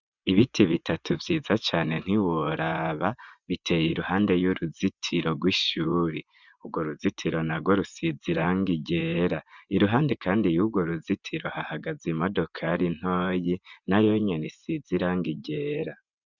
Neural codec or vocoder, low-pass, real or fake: vocoder, 24 kHz, 100 mel bands, Vocos; 7.2 kHz; fake